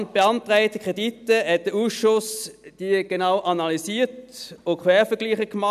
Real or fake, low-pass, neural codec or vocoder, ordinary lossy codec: real; 14.4 kHz; none; none